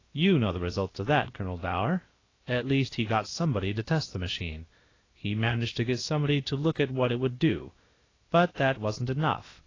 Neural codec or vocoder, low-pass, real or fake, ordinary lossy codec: codec, 16 kHz, about 1 kbps, DyCAST, with the encoder's durations; 7.2 kHz; fake; AAC, 32 kbps